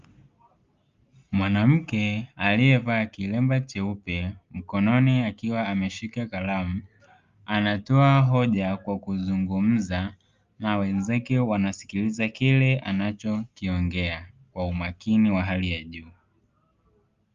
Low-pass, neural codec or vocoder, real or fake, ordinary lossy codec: 7.2 kHz; none; real; Opus, 32 kbps